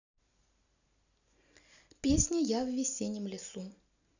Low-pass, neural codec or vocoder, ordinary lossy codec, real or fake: 7.2 kHz; none; none; real